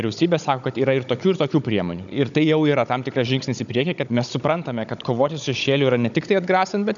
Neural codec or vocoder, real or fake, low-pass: codec, 16 kHz, 16 kbps, FunCodec, trained on Chinese and English, 50 frames a second; fake; 7.2 kHz